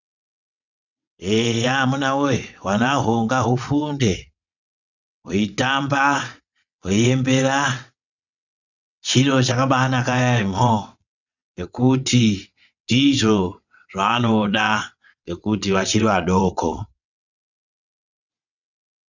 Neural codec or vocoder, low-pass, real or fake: vocoder, 22.05 kHz, 80 mel bands, WaveNeXt; 7.2 kHz; fake